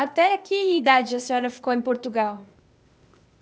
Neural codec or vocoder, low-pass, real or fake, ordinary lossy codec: codec, 16 kHz, 0.8 kbps, ZipCodec; none; fake; none